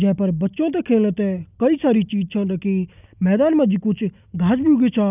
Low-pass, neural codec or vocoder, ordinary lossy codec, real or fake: 3.6 kHz; none; none; real